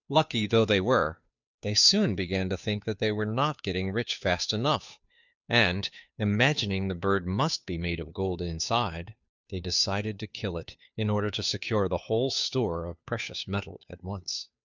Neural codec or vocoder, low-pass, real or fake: codec, 16 kHz, 2 kbps, FunCodec, trained on Chinese and English, 25 frames a second; 7.2 kHz; fake